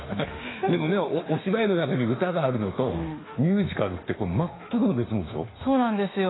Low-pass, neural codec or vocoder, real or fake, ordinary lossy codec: 7.2 kHz; autoencoder, 48 kHz, 32 numbers a frame, DAC-VAE, trained on Japanese speech; fake; AAC, 16 kbps